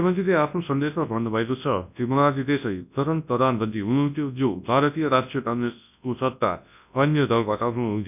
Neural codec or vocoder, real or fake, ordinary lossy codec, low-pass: codec, 24 kHz, 0.9 kbps, WavTokenizer, large speech release; fake; none; 3.6 kHz